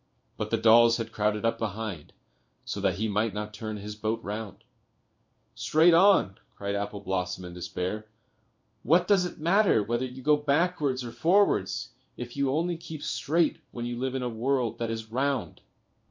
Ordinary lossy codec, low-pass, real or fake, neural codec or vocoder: MP3, 48 kbps; 7.2 kHz; fake; codec, 16 kHz in and 24 kHz out, 1 kbps, XY-Tokenizer